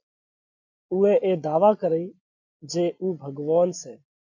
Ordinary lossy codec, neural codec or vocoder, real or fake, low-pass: AAC, 48 kbps; none; real; 7.2 kHz